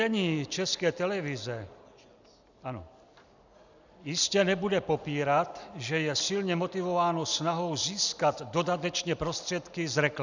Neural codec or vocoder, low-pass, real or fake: none; 7.2 kHz; real